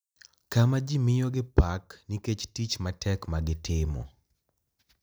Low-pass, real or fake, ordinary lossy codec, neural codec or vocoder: none; real; none; none